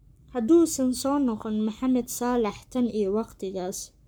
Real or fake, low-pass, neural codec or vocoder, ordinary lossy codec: fake; none; codec, 44.1 kHz, 7.8 kbps, Pupu-Codec; none